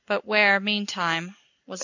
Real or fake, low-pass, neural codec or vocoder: real; 7.2 kHz; none